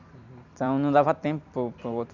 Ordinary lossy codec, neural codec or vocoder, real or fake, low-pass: none; vocoder, 44.1 kHz, 128 mel bands every 256 samples, BigVGAN v2; fake; 7.2 kHz